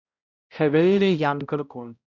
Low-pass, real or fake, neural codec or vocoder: 7.2 kHz; fake; codec, 16 kHz, 0.5 kbps, X-Codec, HuBERT features, trained on balanced general audio